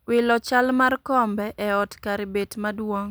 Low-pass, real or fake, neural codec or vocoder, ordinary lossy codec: none; real; none; none